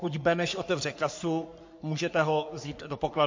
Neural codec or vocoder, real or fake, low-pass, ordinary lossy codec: codec, 44.1 kHz, 3.4 kbps, Pupu-Codec; fake; 7.2 kHz; MP3, 48 kbps